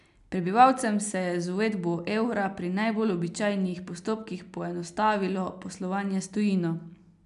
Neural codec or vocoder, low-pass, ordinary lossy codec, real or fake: none; 10.8 kHz; none; real